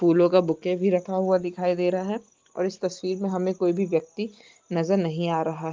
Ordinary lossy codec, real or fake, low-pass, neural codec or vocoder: Opus, 32 kbps; real; 7.2 kHz; none